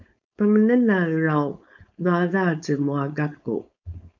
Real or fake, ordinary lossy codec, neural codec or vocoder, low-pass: fake; MP3, 64 kbps; codec, 16 kHz, 4.8 kbps, FACodec; 7.2 kHz